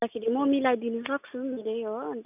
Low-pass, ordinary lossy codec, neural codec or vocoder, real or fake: 3.6 kHz; none; none; real